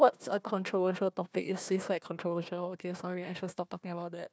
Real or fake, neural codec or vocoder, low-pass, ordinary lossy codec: fake; codec, 16 kHz, 1 kbps, FunCodec, trained on Chinese and English, 50 frames a second; none; none